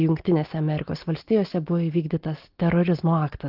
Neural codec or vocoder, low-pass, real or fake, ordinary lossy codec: none; 5.4 kHz; real; Opus, 16 kbps